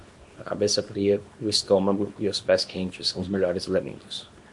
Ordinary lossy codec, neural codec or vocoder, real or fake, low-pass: MP3, 48 kbps; codec, 24 kHz, 0.9 kbps, WavTokenizer, small release; fake; 10.8 kHz